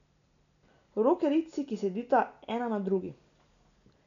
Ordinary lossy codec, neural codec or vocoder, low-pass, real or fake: none; none; 7.2 kHz; real